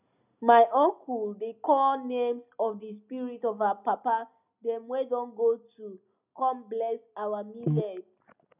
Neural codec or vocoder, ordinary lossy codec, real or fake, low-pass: none; none; real; 3.6 kHz